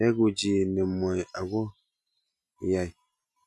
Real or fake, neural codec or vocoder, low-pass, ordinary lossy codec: real; none; none; none